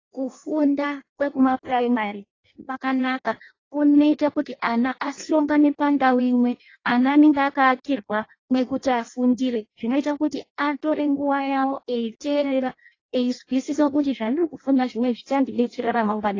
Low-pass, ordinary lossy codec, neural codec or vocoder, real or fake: 7.2 kHz; AAC, 32 kbps; codec, 16 kHz in and 24 kHz out, 0.6 kbps, FireRedTTS-2 codec; fake